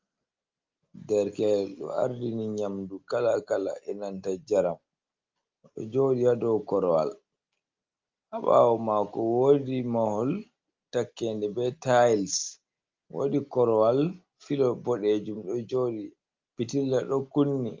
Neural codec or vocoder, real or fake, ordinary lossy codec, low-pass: none; real; Opus, 32 kbps; 7.2 kHz